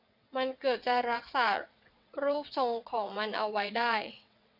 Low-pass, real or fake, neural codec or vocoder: 5.4 kHz; fake; vocoder, 22.05 kHz, 80 mel bands, WaveNeXt